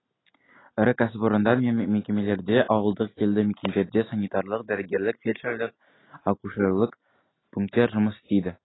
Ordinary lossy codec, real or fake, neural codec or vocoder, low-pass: AAC, 16 kbps; real; none; 7.2 kHz